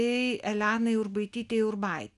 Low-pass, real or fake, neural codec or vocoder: 10.8 kHz; real; none